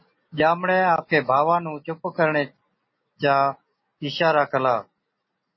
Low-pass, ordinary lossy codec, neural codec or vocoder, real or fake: 7.2 kHz; MP3, 24 kbps; none; real